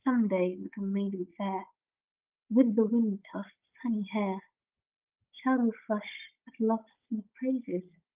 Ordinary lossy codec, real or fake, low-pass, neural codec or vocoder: Opus, 24 kbps; fake; 3.6 kHz; codec, 16 kHz, 16 kbps, FunCodec, trained on Chinese and English, 50 frames a second